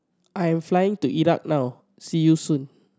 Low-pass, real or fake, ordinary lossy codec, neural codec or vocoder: none; real; none; none